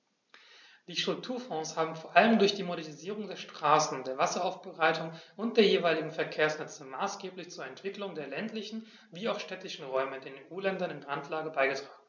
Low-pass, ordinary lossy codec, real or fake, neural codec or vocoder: 7.2 kHz; none; real; none